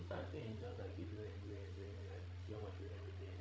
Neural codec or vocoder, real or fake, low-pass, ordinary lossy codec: codec, 16 kHz, 16 kbps, FreqCodec, larger model; fake; none; none